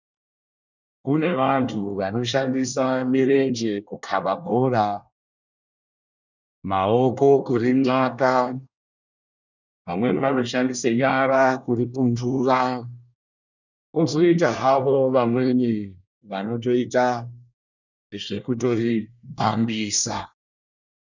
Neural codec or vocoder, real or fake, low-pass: codec, 24 kHz, 1 kbps, SNAC; fake; 7.2 kHz